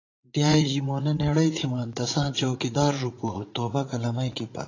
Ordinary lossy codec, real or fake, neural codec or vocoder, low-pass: AAC, 32 kbps; fake; vocoder, 44.1 kHz, 80 mel bands, Vocos; 7.2 kHz